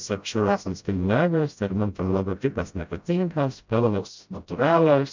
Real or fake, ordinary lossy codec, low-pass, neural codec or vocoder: fake; MP3, 64 kbps; 7.2 kHz; codec, 16 kHz, 0.5 kbps, FreqCodec, smaller model